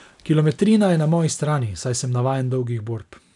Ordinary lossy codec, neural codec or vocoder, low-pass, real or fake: none; none; 10.8 kHz; real